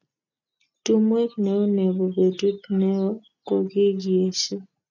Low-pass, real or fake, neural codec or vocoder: 7.2 kHz; real; none